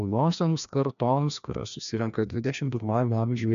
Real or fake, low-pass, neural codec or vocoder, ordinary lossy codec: fake; 7.2 kHz; codec, 16 kHz, 1 kbps, FreqCodec, larger model; MP3, 96 kbps